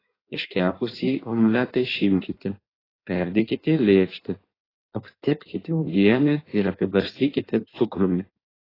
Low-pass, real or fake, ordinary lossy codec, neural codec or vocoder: 5.4 kHz; fake; AAC, 24 kbps; codec, 16 kHz in and 24 kHz out, 1.1 kbps, FireRedTTS-2 codec